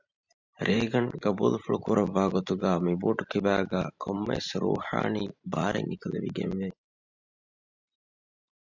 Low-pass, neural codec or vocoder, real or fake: 7.2 kHz; vocoder, 44.1 kHz, 128 mel bands every 512 samples, BigVGAN v2; fake